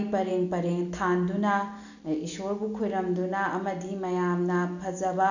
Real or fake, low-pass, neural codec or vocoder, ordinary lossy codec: real; 7.2 kHz; none; none